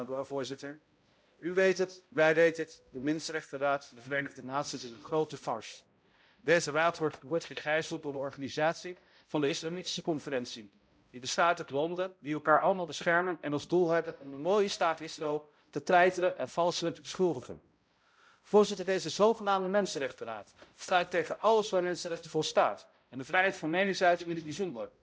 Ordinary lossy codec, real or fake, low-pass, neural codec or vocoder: none; fake; none; codec, 16 kHz, 0.5 kbps, X-Codec, HuBERT features, trained on balanced general audio